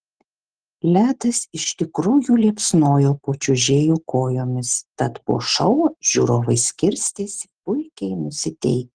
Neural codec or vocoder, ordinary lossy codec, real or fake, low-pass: none; Opus, 16 kbps; real; 9.9 kHz